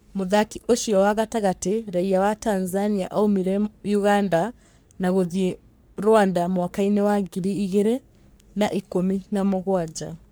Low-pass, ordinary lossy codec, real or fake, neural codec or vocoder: none; none; fake; codec, 44.1 kHz, 3.4 kbps, Pupu-Codec